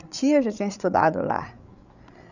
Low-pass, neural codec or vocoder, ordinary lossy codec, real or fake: 7.2 kHz; codec, 16 kHz, 8 kbps, FreqCodec, larger model; none; fake